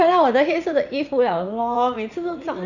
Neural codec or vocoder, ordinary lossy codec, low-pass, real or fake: vocoder, 44.1 kHz, 80 mel bands, Vocos; none; 7.2 kHz; fake